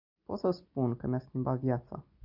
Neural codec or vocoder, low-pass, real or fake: none; 5.4 kHz; real